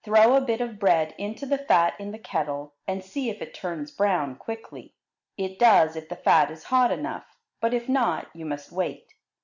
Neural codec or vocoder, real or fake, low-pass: none; real; 7.2 kHz